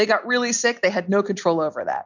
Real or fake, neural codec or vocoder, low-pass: real; none; 7.2 kHz